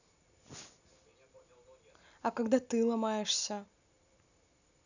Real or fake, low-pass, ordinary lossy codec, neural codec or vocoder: real; 7.2 kHz; none; none